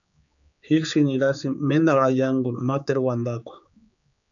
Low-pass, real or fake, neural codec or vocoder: 7.2 kHz; fake; codec, 16 kHz, 4 kbps, X-Codec, HuBERT features, trained on general audio